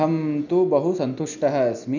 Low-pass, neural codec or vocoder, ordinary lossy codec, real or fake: 7.2 kHz; none; none; real